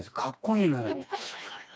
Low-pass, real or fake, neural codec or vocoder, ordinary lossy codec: none; fake; codec, 16 kHz, 2 kbps, FreqCodec, smaller model; none